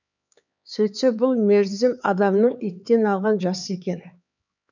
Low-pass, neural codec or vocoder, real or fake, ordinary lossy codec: 7.2 kHz; codec, 16 kHz, 4 kbps, X-Codec, HuBERT features, trained on LibriSpeech; fake; none